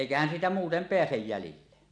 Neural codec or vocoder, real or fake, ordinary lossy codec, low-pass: none; real; none; 9.9 kHz